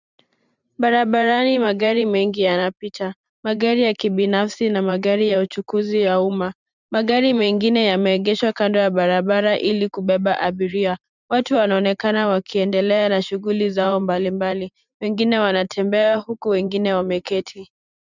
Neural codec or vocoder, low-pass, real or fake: vocoder, 44.1 kHz, 128 mel bands every 512 samples, BigVGAN v2; 7.2 kHz; fake